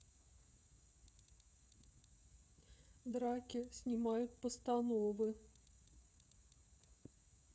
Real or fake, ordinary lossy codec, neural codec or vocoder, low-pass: fake; none; codec, 16 kHz, 16 kbps, FreqCodec, smaller model; none